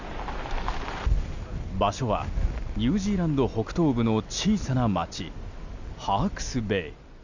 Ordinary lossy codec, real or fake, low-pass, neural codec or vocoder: none; real; 7.2 kHz; none